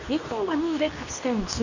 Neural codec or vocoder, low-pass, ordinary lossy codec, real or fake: codec, 24 kHz, 0.9 kbps, WavTokenizer, medium speech release version 2; 7.2 kHz; none; fake